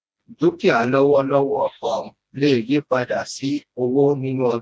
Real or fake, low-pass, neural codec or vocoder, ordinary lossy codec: fake; none; codec, 16 kHz, 1 kbps, FreqCodec, smaller model; none